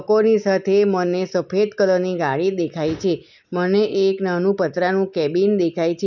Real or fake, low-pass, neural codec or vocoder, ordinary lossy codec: real; 7.2 kHz; none; none